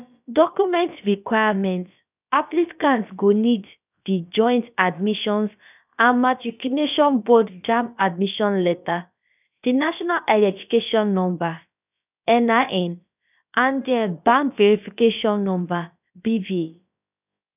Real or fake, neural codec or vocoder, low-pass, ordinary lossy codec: fake; codec, 16 kHz, about 1 kbps, DyCAST, with the encoder's durations; 3.6 kHz; none